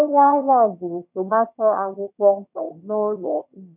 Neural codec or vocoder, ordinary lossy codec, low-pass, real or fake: autoencoder, 22.05 kHz, a latent of 192 numbers a frame, VITS, trained on one speaker; none; 3.6 kHz; fake